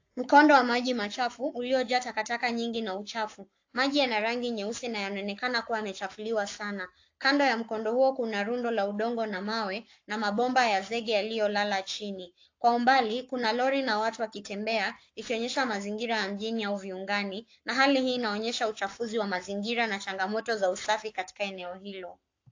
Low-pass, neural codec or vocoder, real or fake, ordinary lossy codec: 7.2 kHz; codec, 44.1 kHz, 7.8 kbps, Pupu-Codec; fake; AAC, 48 kbps